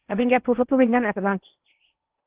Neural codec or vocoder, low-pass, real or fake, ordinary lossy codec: codec, 16 kHz in and 24 kHz out, 0.8 kbps, FocalCodec, streaming, 65536 codes; 3.6 kHz; fake; Opus, 16 kbps